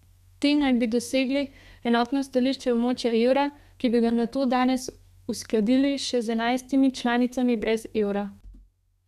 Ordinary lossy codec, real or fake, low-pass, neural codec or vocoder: none; fake; 14.4 kHz; codec, 32 kHz, 1.9 kbps, SNAC